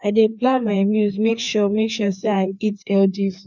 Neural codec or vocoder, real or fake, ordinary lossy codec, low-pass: codec, 16 kHz, 2 kbps, FreqCodec, larger model; fake; none; 7.2 kHz